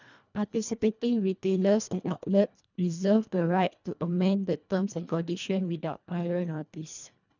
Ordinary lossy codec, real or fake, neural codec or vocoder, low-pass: none; fake; codec, 24 kHz, 1.5 kbps, HILCodec; 7.2 kHz